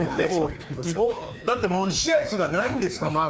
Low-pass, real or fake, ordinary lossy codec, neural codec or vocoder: none; fake; none; codec, 16 kHz, 2 kbps, FreqCodec, larger model